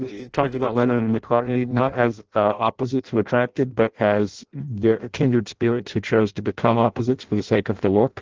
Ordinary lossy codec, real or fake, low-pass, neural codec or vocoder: Opus, 16 kbps; fake; 7.2 kHz; codec, 16 kHz in and 24 kHz out, 0.6 kbps, FireRedTTS-2 codec